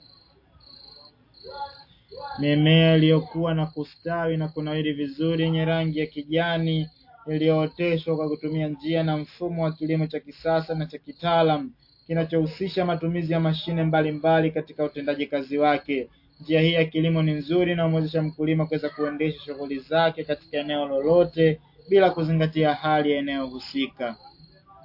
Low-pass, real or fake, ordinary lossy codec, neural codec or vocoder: 5.4 kHz; real; MP3, 32 kbps; none